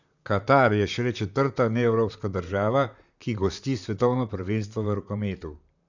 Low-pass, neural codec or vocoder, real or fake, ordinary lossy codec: 7.2 kHz; vocoder, 44.1 kHz, 128 mel bands, Pupu-Vocoder; fake; none